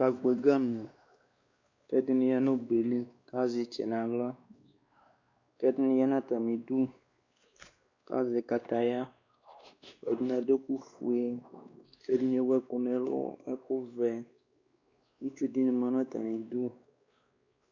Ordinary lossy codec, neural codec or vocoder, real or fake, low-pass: Opus, 64 kbps; codec, 16 kHz, 2 kbps, X-Codec, WavLM features, trained on Multilingual LibriSpeech; fake; 7.2 kHz